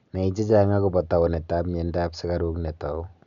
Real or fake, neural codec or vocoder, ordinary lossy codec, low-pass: real; none; none; 7.2 kHz